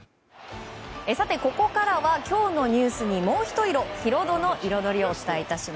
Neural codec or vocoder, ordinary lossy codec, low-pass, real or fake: none; none; none; real